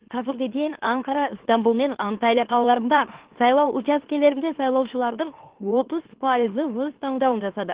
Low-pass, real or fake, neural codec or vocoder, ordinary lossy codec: 3.6 kHz; fake; autoencoder, 44.1 kHz, a latent of 192 numbers a frame, MeloTTS; Opus, 16 kbps